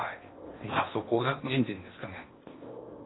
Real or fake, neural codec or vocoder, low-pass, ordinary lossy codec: fake; codec, 16 kHz in and 24 kHz out, 0.8 kbps, FocalCodec, streaming, 65536 codes; 7.2 kHz; AAC, 16 kbps